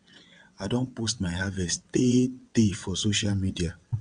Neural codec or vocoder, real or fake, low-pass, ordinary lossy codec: vocoder, 22.05 kHz, 80 mel bands, WaveNeXt; fake; 9.9 kHz; none